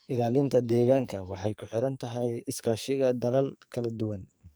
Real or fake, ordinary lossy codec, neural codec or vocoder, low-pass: fake; none; codec, 44.1 kHz, 2.6 kbps, SNAC; none